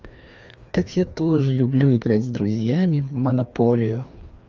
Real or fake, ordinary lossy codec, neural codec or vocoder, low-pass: fake; Opus, 32 kbps; codec, 16 kHz, 2 kbps, FreqCodec, larger model; 7.2 kHz